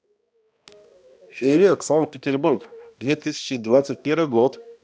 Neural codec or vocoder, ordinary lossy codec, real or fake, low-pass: codec, 16 kHz, 1 kbps, X-Codec, HuBERT features, trained on balanced general audio; none; fake; none